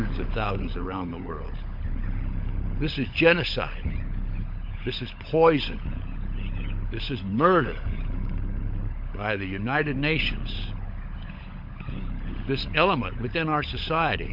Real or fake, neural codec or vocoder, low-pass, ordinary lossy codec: fake; codec, 16 kHz, 16 kbps, FunCodec, trained on LibriTTS, 50 frames a second; 5.4 kHz; MP3, 48 kbps